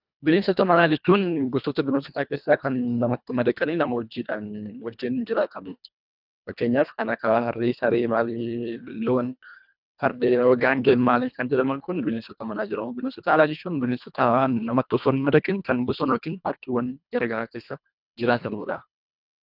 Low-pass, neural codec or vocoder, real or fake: 5.4 kHz; codec, 24 kHz, 1.5 kbps, HILCodec; fake